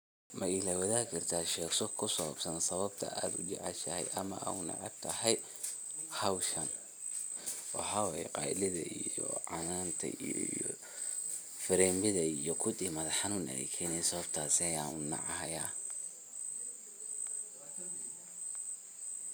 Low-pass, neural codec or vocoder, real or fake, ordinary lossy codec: none; none; real; none